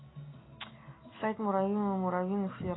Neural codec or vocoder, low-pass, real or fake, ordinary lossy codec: none; 7.2 kHz; real; AAC, 16 kbps